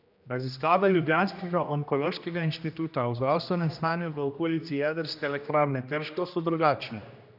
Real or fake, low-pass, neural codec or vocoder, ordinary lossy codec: fake; 5.4 kHz; codec, 16 kHz, 1 kbps, X-Codec, HuBERT features, trained on general audio; none